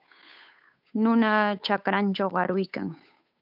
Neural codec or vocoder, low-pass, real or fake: codec, 16 kHz, 8 kbps, FunCodec, trained on Chinese and English, 25 frames a second; 5.4 kHz; fake